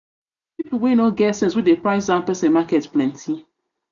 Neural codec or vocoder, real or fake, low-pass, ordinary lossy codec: none; real; 7.2 kHz; none